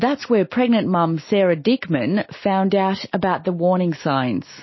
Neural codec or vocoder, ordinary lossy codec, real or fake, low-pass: none; MP3, 24 kbps; real; 7.2 kHz